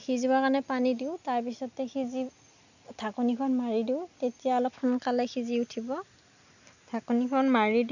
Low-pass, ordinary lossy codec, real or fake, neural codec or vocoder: 7.2 kHz; none; real; none